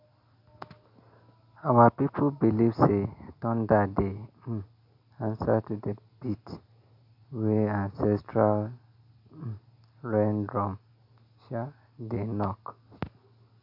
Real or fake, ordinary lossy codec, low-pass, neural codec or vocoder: real; none; 5.4 kHz; none